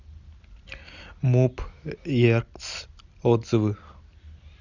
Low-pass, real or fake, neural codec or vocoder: 7.2 kHz; real; none